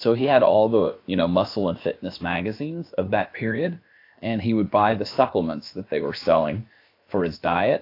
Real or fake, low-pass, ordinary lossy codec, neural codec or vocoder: fake; 5.4 kHz; AAC, 32 kbps; codec, 16 kHz, about 1 kbps, DyCAST, with the encoder's durations